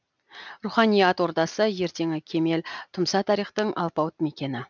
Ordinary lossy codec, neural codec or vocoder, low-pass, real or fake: none; none; 7.2 kHz; real